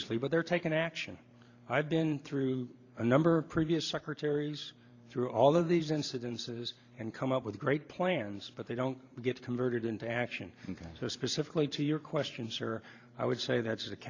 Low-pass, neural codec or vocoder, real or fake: 7.2 kHz; vocoder, 44.1 kHz, 128 mel bands every 512 samples, BigVGAN v2; fake